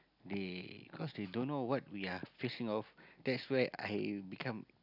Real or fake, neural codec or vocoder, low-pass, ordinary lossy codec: real; none; 5.4 kHz; none